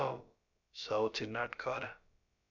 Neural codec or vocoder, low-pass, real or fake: codec, 16 kHz, about 1 kbps, DyCAST, with the encoder's durations; 7.2 kHz; fake